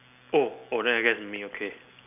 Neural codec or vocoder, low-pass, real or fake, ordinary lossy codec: none; 3.6 kHz; real; none